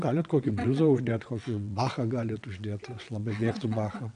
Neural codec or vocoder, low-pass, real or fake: vocoder, 22.05 kHz, 80 mel bands, WaveNeXt; 9.9 kHz; fake